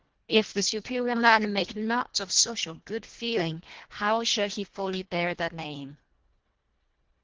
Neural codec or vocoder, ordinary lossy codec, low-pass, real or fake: codec, 24 kHz, 1.5 kbps, HILCodec; Opus, 16 kbps; 7.2 kHz; fake